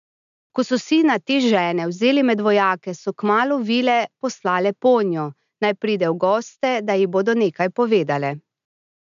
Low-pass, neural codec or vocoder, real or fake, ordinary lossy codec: 7.2 kHz; none; real; MP3, 96 kbps